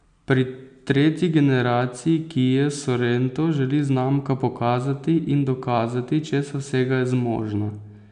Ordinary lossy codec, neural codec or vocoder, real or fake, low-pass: none; none; real; 9.9 kHz